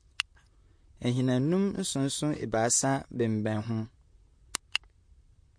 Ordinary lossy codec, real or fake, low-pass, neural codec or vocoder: MP3, 48 kbps; fake; 9.9 kHz; vocoder, 44.1 kHz, 128 mel bands, Pupu-Vocoder